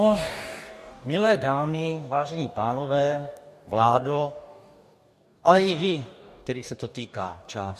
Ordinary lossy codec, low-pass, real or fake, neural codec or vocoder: MP3, 64 kbps; 14.4 kHz; fake; codec, 44.1 kHz, 2.6 kbps, DAC